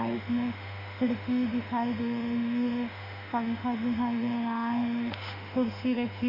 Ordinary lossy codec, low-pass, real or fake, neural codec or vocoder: none; 5.4 kHz; fake; autoencoder, 48 kHz, 32 numbers a frame, DAC-VAE, trained on Japanese speech